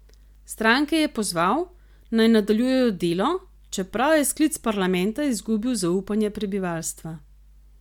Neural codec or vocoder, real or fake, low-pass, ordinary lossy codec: none; real; 19.8 kHz; MP3, 96 kbps